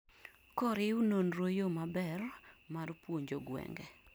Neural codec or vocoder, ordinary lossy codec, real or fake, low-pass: none; none; real; none